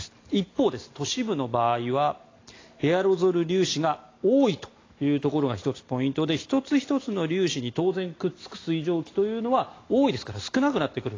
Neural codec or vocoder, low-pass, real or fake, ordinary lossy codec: none; 7.2 kHz; real; AAC, 32 kbps